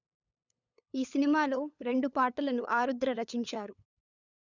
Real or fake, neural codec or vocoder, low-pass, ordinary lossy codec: fake; codec, 16 kHz, 8 kbps, FunCodec, trained on LibriTTS, 25 frames a second; 7.2 kHz; none